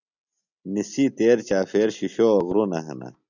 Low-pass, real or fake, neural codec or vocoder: 7.2 kHz; real; none